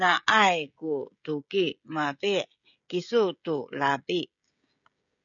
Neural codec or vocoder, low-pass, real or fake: codec, 16 kHz, 16 kbps, FreqCodec, smaller model; 7.2 kHz; fake